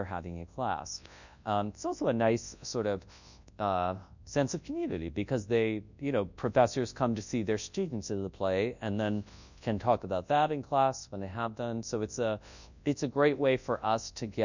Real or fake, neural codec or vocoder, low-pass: fake; codec, 24 kHz, 0.9 kbps, WavTokenizer, large speech release; 7.2 kHz